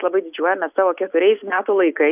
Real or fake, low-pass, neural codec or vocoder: real; 3.6 kHz; none